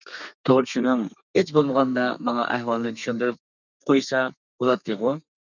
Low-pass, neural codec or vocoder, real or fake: 7.2 kHz; codec, 32 kHz, 1.9 kbps, SNAC; fake